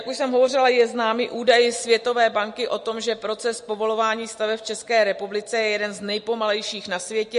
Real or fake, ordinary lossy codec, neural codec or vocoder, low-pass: real; MP3, 48 kbps; none; 10.8 kHz